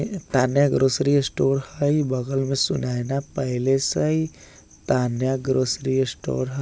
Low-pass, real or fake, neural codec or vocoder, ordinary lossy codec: none; real; none; none